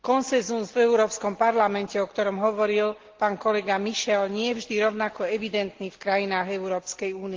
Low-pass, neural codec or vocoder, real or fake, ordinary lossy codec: 7.2 kHz; none; real; Opus, 16 kbps